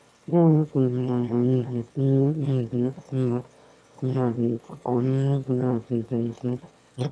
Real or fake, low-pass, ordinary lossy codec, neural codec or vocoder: fake; none; none; autoencoder, 22.05 kHz, a latent of 192 numbers a frame, VITS, trained on one speaker